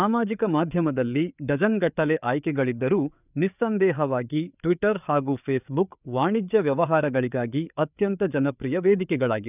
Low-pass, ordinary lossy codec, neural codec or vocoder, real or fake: 3.6 kHz; none; codec, 16 kHz, 4 kbps, FreqCodec, larger model; fake